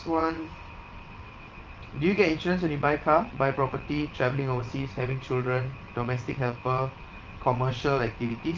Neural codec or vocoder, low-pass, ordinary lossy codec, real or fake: vocoder, 22.05 kHz, 80 mel bands, WaveNeXt; 7.2 kHz; Opus, 32 kbps; fake